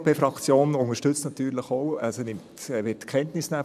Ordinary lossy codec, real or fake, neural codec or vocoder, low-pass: none; fake; vocoder, 44.1 kHz, 128 mel bands every 512 samples, BigVGAN v2; 14.4 kHz